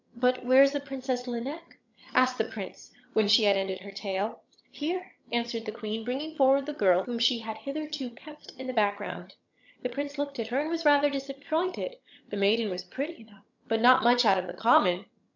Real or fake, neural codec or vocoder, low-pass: fake; vocoder, 22.05 kHz, 80 mel bands, HiFi-GAN; 7.2 kHz